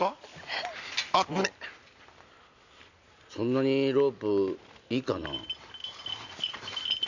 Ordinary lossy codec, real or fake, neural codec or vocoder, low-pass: none; real; none; 7.2 kHz